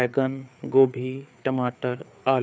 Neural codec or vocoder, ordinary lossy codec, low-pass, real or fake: codec, 16 kHz, 4 kbps, FreqCodec, larger model; none; none; fake